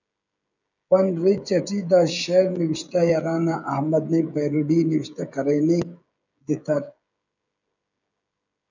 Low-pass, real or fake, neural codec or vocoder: 7.2 kHz; fake; codec, 16 kHz, 16 kbps, FreqCodec, smaller model